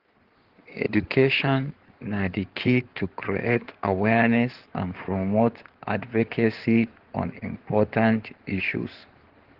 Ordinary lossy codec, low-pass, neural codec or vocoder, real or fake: Opus, 16 kbps; 5.4 kHz; codec, 16 kHz in and 24 kHz out, 2.2 kbps, FireRedTTS-2 codec; fake